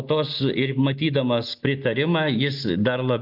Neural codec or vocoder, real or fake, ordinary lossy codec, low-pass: none; real; AAC, 48 kbps; 5.4 kHz